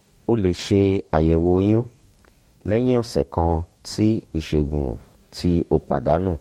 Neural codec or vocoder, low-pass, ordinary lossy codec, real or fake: codec, 44.1 kHz, 2.6 kbps, DAC; 19.8 kHz; MP3, 64 kbps; fake